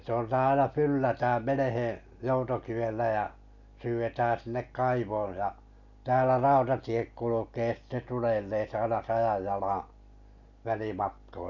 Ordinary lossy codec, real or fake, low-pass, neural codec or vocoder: none; real; 7.2 kHz; none